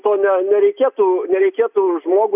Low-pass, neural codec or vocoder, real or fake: 3.6 kHz; none; real